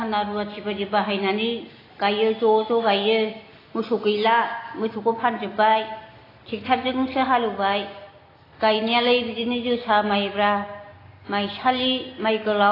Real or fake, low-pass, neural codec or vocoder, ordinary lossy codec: real; 5.4 kHz; none; AAC, 24 kbps